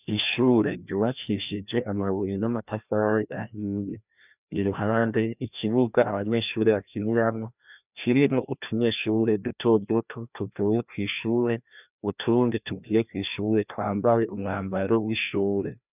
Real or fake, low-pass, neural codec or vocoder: fake; 3.6 kHz; codec, 16 kHz, 1 kbps, FreqCodec, larger model